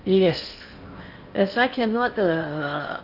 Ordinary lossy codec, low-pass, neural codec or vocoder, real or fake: Opus, 64 kbps; 5.4 kHz; codec, 16 kHz in and 24 kHz out, 0.8 kbps, FocalCodec, streaming, 65536 codes; fake